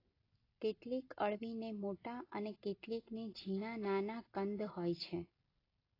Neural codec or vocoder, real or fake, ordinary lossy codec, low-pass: none; real; AAC, 24 kbps; 5.4 kHz